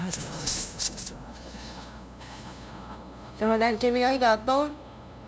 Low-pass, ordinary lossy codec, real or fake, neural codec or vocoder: none; none; fake; codec, 16 kHz, 0.5 kbps, FunCodec, trained on LibriTTS, 25 frames a second